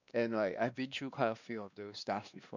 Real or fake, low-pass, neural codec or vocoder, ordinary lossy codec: fake; 7.2 kHz; codec, 16 kHz, 2 kbps, X-Codec, WavLM features, trained on Multilingual LibriSpeech; none